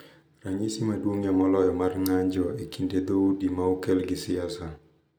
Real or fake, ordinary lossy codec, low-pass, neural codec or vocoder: real; none; none; none